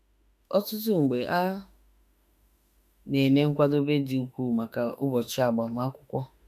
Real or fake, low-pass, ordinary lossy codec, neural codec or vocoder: fake; 14.4 kHz; none; autoencoder, 48 kHz, 32 numbers a frame, DAC-VAE, trained on Japanese speech